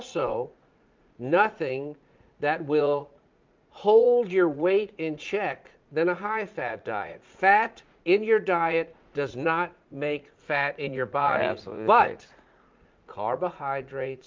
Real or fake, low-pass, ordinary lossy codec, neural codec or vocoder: fake; 7.2 kHz; Opus, 24 kbps; vocoder, 44.1 kHz, 80 mel bands, Vocos